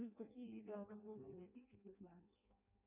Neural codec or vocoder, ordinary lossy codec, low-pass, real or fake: codec, 16 kHz in and 24 kHz out, 0.6 kbps, FireRedTTS-2 codec; MP3, 32 kbps; 3.6 kHz; fake